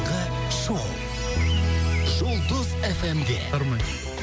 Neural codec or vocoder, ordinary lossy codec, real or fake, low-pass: none; none; real; none